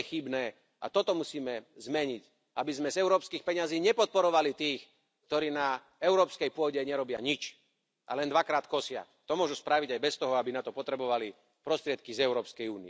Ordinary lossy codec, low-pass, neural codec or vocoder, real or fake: none; none; none; real